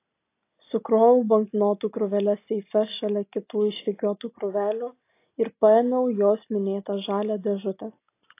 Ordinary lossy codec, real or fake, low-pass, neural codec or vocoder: AAC, 24 kbps; real; 3.6 kHz; none